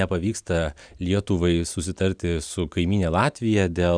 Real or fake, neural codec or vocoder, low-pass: real; none; 9.9 kHz